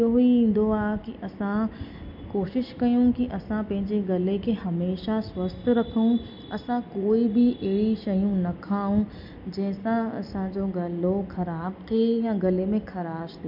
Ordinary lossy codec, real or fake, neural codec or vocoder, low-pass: MP3, 48 kbps; real; none; 5.4 kHz